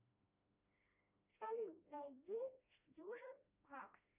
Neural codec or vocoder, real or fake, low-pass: codec, 16 kHz, 1 kbps, FreqCodec, smaller model; fake; 3.6 kHz